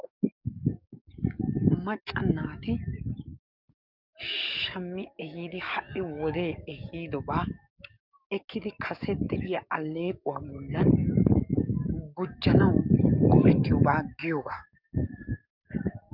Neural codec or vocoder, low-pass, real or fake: codec, 44.1 kHz, 7.8 kbps, DAC; 5.4 kHz; fake